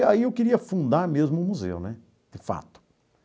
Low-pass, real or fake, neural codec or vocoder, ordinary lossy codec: none; real; none; none